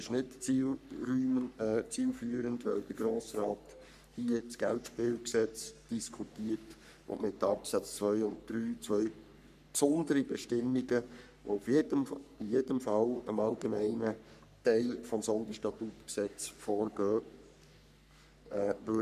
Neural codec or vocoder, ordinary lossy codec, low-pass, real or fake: codec, 44.1 kHz, 3.4 kbps, Pupu-Codec; none; 14.4 kHz; fake